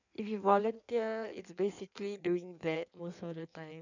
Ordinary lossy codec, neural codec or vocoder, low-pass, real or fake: none; codec, 16 kHz in and 24 kHz out, 1.1 kbps, FireRedTTS-2 codec; 7.2 kHz; fake